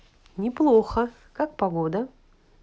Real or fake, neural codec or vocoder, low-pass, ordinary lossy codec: real; none; none; none